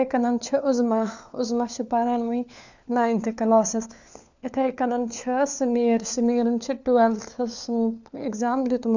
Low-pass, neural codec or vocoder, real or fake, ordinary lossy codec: 7.2 kHz; codec, 16 kHz, 4 kbps, FunCodec, trained on LibriTTS, 50 frames a second; fake; none